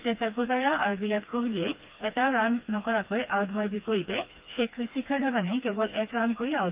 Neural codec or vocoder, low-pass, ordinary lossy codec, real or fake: codec, 16 kHz, 2 kbps, FreqCodec, smaller model; 3.6 kHz; Opus, 24 kbps; fake